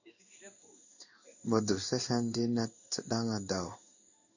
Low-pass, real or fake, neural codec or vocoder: 7.2 kHz; fake; codec, 16 kHz in and 24 kHz out, 1 kbps, XY-Tokenizer